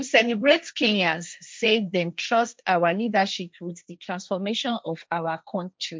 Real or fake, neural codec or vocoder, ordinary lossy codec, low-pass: fake; codec, 16 kHz, 1.1 kbps, Voila-Tokenizer; none; none